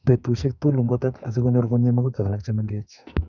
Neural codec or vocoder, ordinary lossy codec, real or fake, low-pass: codec, 44.1 kHz, 2.6 kbps, SNAC; none; fake; 7.2 kHz